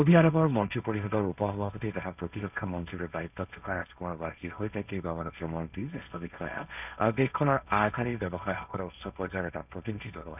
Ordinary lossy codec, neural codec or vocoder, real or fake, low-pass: none; codec, 16 kHz, 1.1 kbps, Voila-Tokenizer; fake; 3.6 kHz